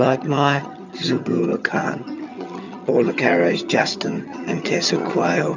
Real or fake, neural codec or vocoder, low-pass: fake; vocoder, 22.05 kHz, 80 mel bands, HiFi-GAN; 7.2 kHz